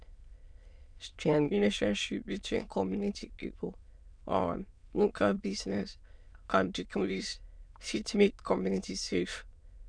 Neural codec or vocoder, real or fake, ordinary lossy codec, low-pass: autoencoder, 22.05 kHz, a latent of 192 numbers a frame, VITS, trained on many speakers; fake; none; 9.9 kHz